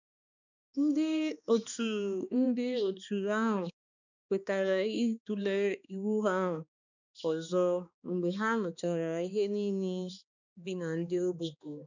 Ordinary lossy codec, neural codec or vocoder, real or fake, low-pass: none; codec, 16 kHz, 2 kbps, X-Codec, HuBERT features, trained on balanced general audio; fake; 7.2 kHz